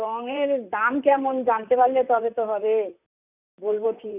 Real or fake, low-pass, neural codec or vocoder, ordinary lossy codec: fake; 3.6 kHz; vocoder, 44.1 kHz, 128 mel bands, Pupu-Vocoder; none